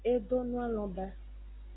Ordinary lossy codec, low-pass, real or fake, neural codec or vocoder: AAC, 16 kbps; 7.2 kHz; real; none